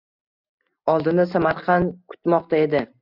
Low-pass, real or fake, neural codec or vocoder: 5.4 kHz; real; none